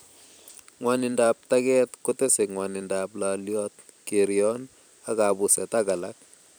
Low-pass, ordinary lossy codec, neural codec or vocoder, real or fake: none; none; vocoder, 44.1 kHz, 128 mel bands every 256 samples, BigVGAN v2; fake